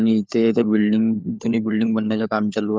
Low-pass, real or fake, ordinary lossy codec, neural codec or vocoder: none; fake; none; codec, 16 kHz, 4 kbps, FunCodec, trained on LibriTTS, 50 frames a second